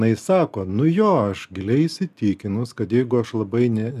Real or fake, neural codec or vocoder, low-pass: real; none; 14.4 kHz